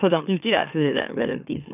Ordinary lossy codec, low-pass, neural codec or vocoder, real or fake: none; 3.6 kHz; autoencoder, 44.1 kHz, a latent of 192 numbers a frame, MeloTTS; fake